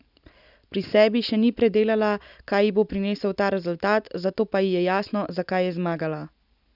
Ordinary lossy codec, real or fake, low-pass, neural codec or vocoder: none; real; 5.4 kHz; none